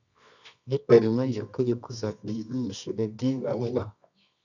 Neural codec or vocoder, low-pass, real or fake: codec, 24 kHz, 0.9 kbps, WavTokenizer, medium music audio release; 7.2 kHz; fake